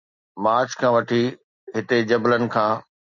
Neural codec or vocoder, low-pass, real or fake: none; 7.2 kHz; real